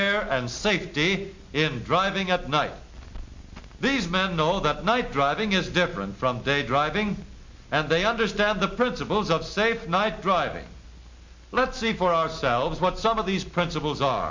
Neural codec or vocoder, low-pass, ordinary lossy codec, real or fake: none; 7.2 kHz; MP3, 48 kbps; real